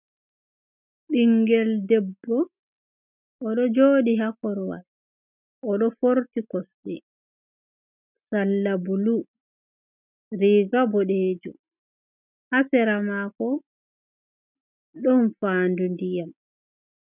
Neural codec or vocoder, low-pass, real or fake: none; 3.6 kHz; real